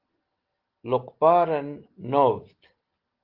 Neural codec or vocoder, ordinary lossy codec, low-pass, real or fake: none; Opus, 16 kbps; 5.4 kHz; real